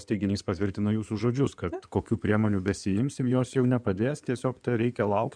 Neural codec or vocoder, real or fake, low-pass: codec, 16 kHz in and 24 kHz out, 2.2 kbps, FireRedTTS-2 codec; fake; 9.9 kHz